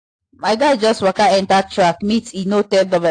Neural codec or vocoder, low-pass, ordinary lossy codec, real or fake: none; 9.9 kHz; AAC, 48 kbps; real